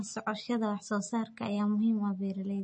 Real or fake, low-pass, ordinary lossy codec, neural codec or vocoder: real; 10.8 kHz; MP3, 32 kbps; none